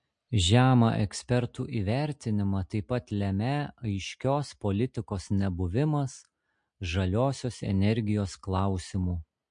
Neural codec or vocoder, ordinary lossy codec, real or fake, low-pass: none; MP3, 48 kbps; real; 9.9 kHz